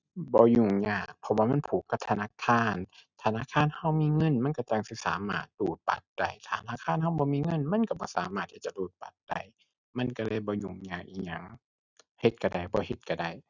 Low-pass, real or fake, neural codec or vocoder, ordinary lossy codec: 7.2 kHz; real; none; none